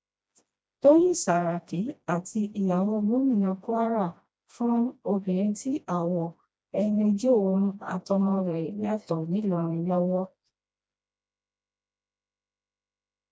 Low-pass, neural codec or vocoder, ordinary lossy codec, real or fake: none; codec, 16 kHz, 1 kbps, FreqCodec, smaller model; none; fake